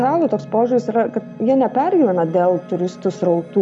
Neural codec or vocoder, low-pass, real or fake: none; 10.8 kHz; real